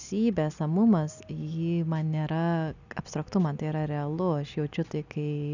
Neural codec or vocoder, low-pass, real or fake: none; 7.2 kHz; real